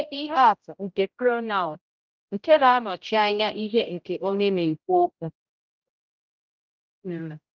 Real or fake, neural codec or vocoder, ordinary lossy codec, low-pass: fake; codec, 16 kHz, 0.5 kbps, X-Codec, HuBERT features, trained on general audio; Opus, 24 kbps; 7.2 kHz